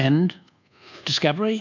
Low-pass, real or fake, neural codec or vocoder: 7.2 kHz; fake; codec, 24 kHz, 1.2 kbps, DualCodec